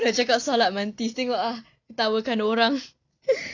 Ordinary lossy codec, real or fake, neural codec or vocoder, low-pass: none; real; none; 7.2 kHz